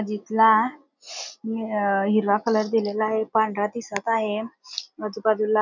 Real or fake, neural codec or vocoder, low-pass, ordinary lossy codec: real; none; 7.2 kHz; none